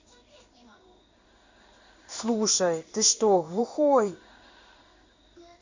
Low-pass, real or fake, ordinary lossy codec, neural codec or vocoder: 7.2 kHz; fake; Opus, 64 kbps; codec, 16 kHz in and 24 kHz out, 1 kbps, XY-Tokenizer